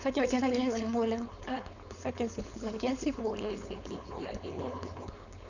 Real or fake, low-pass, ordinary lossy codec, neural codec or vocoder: fake; 7.2 kHz; none; codec, 16 kHz, 4.8 kbps, FACodec